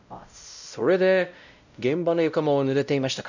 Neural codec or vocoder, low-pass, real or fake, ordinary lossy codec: codec, 16 kHz, 0.5 kbps, X-Codec, WavLM features, trained on Multilingual LibriSpeech; 7.2 kHz; fake; none